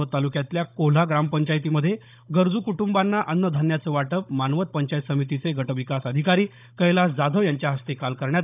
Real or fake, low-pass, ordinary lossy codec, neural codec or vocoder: fake; 3.6 kHz; none; codec, 16 kHz, 16 kbps, FunCodec, trained on Chinese and English, 50 frames a second